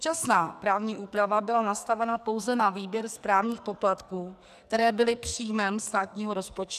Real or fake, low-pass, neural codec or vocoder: fake; 14.4 kHz; codec, 44.1 kHz, 2.6 kbps, SNAC